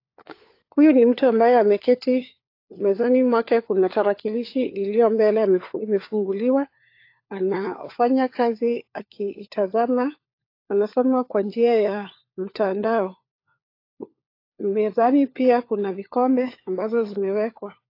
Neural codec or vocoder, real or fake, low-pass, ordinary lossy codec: codec, 16 kHz, 4 kbps, FunCodec, trained on LibriTTS, 50 frames a second; fake; 5.4 kHz; AAC, 32 kbps